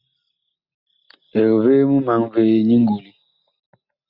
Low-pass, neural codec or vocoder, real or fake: 5.4 kHz; none; real